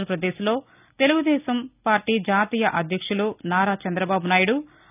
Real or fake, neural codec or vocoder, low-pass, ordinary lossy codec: real; none; 3.6 kHz; none